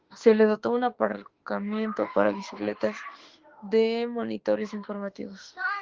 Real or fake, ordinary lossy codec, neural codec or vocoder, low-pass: fake; Opus, 16 kbps; autoencoder, 48 kHz, 32 numbers a frame, DAC-VAE, trained on Japanese speech; 7.2 kHz